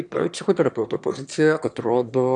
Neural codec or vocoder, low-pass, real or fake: autoencoder, 22.05 kHz, a latent of 192 numbers a frame, VITS, trained on one speaker; 9.9 kHz; fake